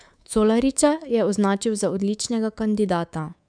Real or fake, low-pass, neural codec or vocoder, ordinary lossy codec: fake; 9.9 kHz; codec, 24 kHz, 3.1 kbps, DualCodec; none